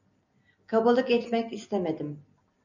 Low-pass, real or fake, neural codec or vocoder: 7.2 kHz; real; none